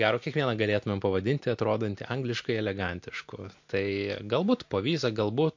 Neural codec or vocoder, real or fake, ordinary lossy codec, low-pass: none; real; MP3, 48 kbps; 7.2 kHz